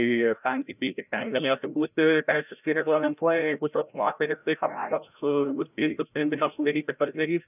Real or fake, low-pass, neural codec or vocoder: fake; 3.6 kHz; codec, 16 kHz, 0.5 kbps, FreqCodec, larger model